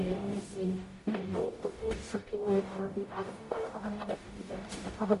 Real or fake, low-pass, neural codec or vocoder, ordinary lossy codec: fake; 14.4 kHz; codec, 44.1 kHz, 0.9 kbps, DAC; MP3, 48 kbps